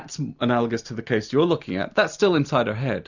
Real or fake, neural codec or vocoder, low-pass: real; none; 7.2 kHz